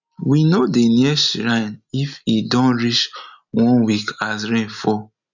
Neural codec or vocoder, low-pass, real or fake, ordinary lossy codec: none; 7.2 kHz; real; none